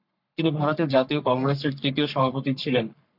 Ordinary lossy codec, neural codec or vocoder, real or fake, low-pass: MP3, 48 kbps; codec, 44.1 kHz, 3.4 kbps, Pupu-Codec; fake; 5.4 kHz